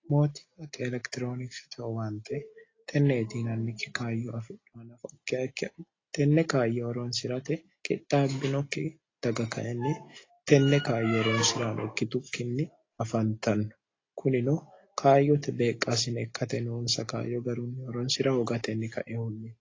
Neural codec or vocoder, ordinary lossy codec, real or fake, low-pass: none; AAC, 32 kbps; real; 7.2 kHz